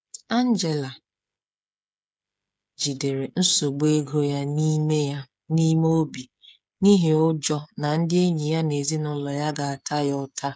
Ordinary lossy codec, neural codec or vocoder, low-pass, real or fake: none; codec, 16 kHz, 16 kbps, FreqCodec, smaller model; none; fake